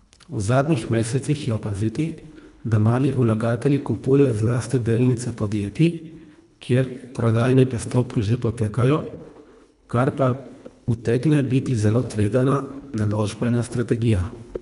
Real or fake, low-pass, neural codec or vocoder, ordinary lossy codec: fake; 10.8 kHz; codec, 24 kHz, 1.5 kbps, HILCodec; none